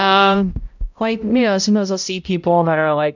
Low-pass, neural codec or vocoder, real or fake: 7.2 kHz; codec, 16 kHz, 0.5 kbps, X-Codec, HuBERT features, trained on balanced general audio; fake